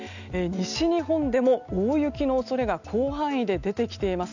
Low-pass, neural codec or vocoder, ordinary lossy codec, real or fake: 7.2 kHz; none; none; real